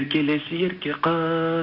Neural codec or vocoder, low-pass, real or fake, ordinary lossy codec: none; 5.4 kHz; real; none